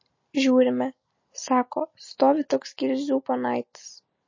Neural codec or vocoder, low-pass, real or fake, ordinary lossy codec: none; 7.2 kHz; real; MP3, 32 kbps